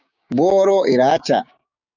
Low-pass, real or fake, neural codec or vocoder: 7.2 kHz; fake; codec, 16 kHz, 6 kbps, DAC